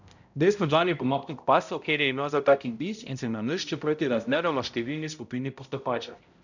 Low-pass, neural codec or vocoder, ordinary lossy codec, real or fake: 7.2 kHz; codec, 16 kHz, 0.5 kbps, X-Codec, HuBERT features, trained on balanced general audio; none; fake